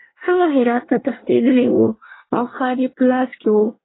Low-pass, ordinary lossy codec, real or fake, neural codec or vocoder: 7.2 kHz; AAC, 16 kbps; fake; codec, 24 kHz, 1 kbps, SNAC